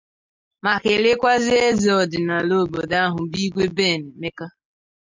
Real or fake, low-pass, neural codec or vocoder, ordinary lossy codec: real; 7.2 kHz; none; MP3, 64 kbps